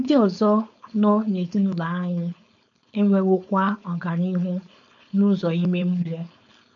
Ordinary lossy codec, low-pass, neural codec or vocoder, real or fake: none; 7.2 kHz; codec, 16 kHz, 4.8 kbps, FACodec; fake